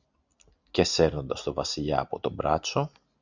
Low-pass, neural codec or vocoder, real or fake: 7.2 kHz; none; real